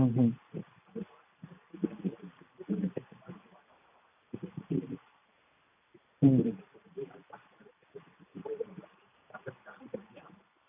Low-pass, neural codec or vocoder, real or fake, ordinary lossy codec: 3.6 kHz; none; real; none